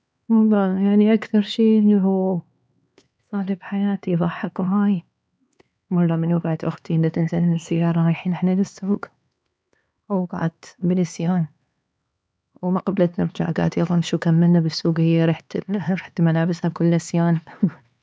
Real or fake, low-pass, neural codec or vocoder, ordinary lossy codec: fake; none; codec, 16 kHz, 4 kbps, X-Codec, HuBERT features, trained on LibriSpeech; none